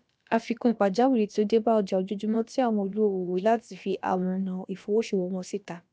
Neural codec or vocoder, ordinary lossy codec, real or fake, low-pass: codec, 16 kHz, about 1 kbps, DyCAST, with the encoder's durations; none; fake; none